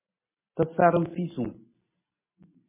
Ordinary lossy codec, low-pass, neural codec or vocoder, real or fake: MP3, 16 kbps; 3.6 kHz; none; real